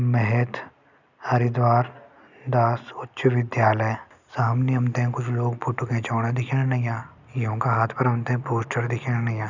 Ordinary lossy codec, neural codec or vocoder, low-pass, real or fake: none; none; 7.2 kHz; real